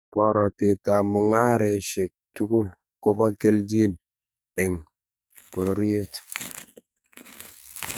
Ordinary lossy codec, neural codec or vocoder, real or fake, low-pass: none; codec, 44.1 kHz, 2.6 kbps, SNAC; fake; none